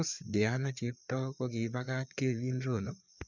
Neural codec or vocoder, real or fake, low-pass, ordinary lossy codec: codec, 16 kHz, 4 kbps, FreqCodec, larger model; fake; 7.2 kHz; none